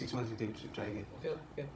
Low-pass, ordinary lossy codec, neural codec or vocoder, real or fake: none; none; codec, 16 kHz, 16 kbps, FunCodec, trained on Chinese and English, 50 frames a second; fake